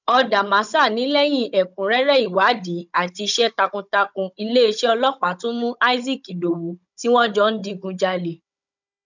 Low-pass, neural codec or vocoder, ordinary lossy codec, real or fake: 7.2 kHz; codec, 16 kHz, 16 kbps, FunCodec, trained on Chinese and English, 50 frames a second; none; fake